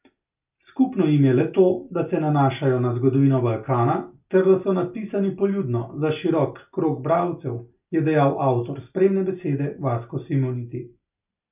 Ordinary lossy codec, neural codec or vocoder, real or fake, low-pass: none; none; real; 3.6 kHz